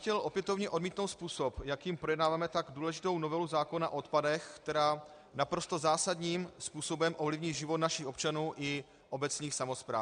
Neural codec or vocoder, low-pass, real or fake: none; 9.9 kHz; real